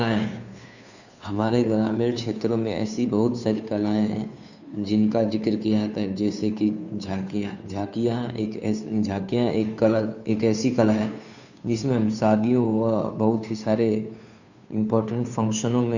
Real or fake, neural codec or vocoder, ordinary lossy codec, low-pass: fake; codec, 16 kHz, 2 kbps, FunCodec, trained on Chinese and English, 25 frames a second; none; 7.2 kHz